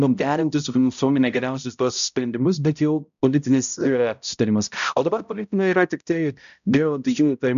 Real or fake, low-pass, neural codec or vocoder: fake; 7.2 kHz; codec, 16 kHz, 0.5 kbps, X-Codec, HuBERT features, trained on balanced general audio